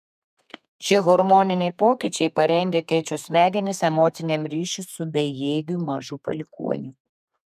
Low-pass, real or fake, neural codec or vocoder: 14.4 kHz; fake; codec, 32 kHz, 1.9 kbps, SNAC